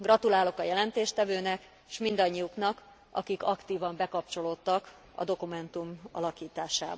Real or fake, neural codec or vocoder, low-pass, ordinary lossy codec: real; none; none; none